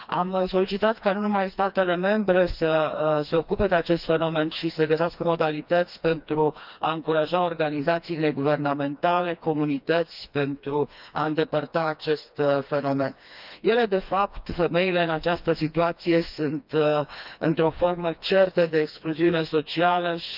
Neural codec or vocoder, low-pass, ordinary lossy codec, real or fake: codec, 16 kHz, 2 kbps, FreqCodec, smaller model; 5.4 kHz; none; fake